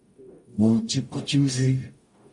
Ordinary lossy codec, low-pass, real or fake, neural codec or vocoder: MP3, 48 kbps; 10.8 kHz; fake; codec, 44.1 kHz, 0.9 kbps, DAC